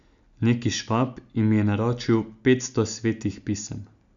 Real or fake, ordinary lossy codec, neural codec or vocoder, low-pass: real; none; none; 7.2 kHz